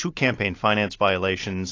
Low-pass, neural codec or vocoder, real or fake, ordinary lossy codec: 7.2 kHz; none; real; AAC, 32 kbps